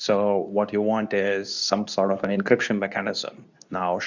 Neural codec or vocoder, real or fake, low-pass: codec, 24 kHz, 0.9 kbps, WavTokenizer, medium speech release version 2; fake; 7.2 kHz